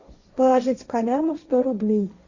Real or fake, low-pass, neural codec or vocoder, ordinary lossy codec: fake; 7.2 kHz; codec, 16 kHz, 1.1 kbps, Voila-Tokenizer; Opus, 64 kbps